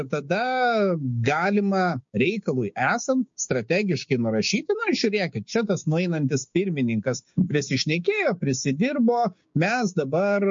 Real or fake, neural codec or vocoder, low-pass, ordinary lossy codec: fake; codec, 16 kHz, 6 kbps, DAC; 7.2 kHz; MP3, 48 kbps